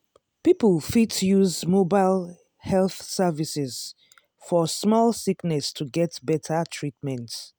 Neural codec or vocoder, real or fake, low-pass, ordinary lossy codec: none; real; none; none